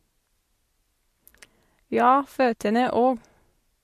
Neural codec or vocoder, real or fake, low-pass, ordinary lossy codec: none; real; 14.4 kHz; MP3, 64 kbps